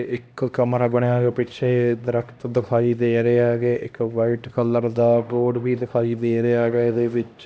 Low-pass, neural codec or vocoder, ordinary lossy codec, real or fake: none; codec, 16 kHz, 1 kbps, X-Codec, HuBERT features, trained on LibriSpeech; none; fake